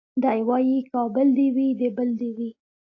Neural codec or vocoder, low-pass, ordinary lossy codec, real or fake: none; 7.2 kHz; AAC, 32 kbps; real